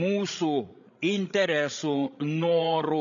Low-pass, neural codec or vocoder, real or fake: 7.2 kHz; codec, 16 kHz, 16 kbps, FreqCodec, larger model; fake